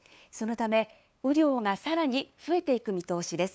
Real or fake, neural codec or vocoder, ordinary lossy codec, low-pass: fake; codec, 16 kHz, 2 kbps, FunCodec, trained on LibriTTS, 25 frames a second; none; none